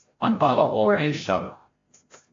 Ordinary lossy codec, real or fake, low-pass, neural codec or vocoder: AAC, 32 kbps; fake; 7.2 kHz; codec, 16 kHz, 0.5 kbps, FreqCodec, larger model